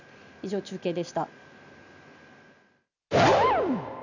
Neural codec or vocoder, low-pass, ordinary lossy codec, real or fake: none; 7.2 kHz; none; real